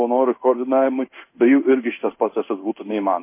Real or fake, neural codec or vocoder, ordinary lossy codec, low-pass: fake; codec, 16 kHz in and 24 kHz out, 1 kbps, XY-Tokenizer; MP3, 24 kbps; 3.6 kHz